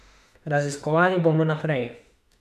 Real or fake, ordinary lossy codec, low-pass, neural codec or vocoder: fake; none; 14.4 kHz; autoencoder, 48 kHz, 32 numbers a frame, DAC-VAE, trained on Japanese speech